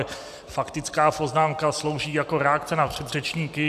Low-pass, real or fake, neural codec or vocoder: 14.4 kHz; real; none